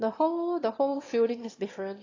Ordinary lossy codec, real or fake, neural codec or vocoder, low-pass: AAC, 32 kbps; fake; autoencoder, 22.05 kHz, a latent of 192 numbers a frame, VITS, trained on one speaker; 7.2 kHz